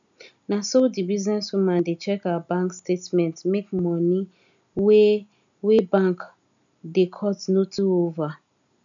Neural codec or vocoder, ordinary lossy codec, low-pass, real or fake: none; none; 7.2 kHz; real